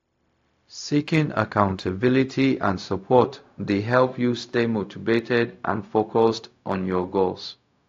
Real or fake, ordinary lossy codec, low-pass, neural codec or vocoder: fake; AAC, 48 kbps; 7.2 kHz; codec, 16 kHz, 0.4 kbps, LongCat-Audio-Codec